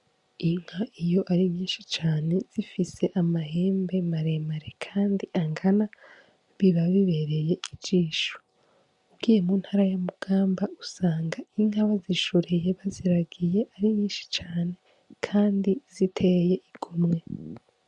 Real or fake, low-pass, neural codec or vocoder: real; 10.8 kHz; none